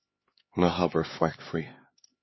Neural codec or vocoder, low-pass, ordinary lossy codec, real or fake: codec, 16 kHz, 4 kbps, X-Codec, HuBERT features, trained on LibriSpeech; 7.2 kHz; MP3, 24 kbps; fake